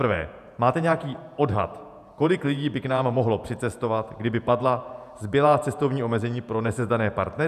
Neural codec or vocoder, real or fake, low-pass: vocoder, 48 kHz, 128 mel bands, Vocos; fake; 14.4 kHz